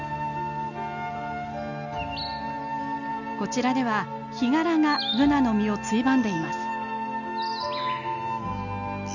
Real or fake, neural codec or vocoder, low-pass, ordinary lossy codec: real; none; 7.2 kHz; none